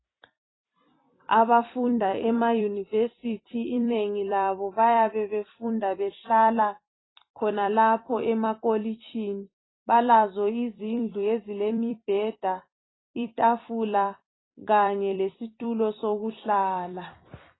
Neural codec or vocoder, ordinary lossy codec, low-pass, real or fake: vocoder, 44.1 kHz, 128 mel bands every 256 samples, BigVGAN v2; AAC, 16 kbps; 7.2 kHz; fake